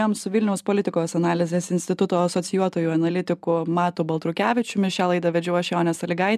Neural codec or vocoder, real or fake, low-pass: none; real; 14.4 kHz